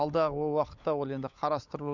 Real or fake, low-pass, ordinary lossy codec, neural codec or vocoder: fake; 7.2 kHz; none; codec, 16 kHz, 16 kbps, FunCodec, trained on LibriTTS, 50 frames a second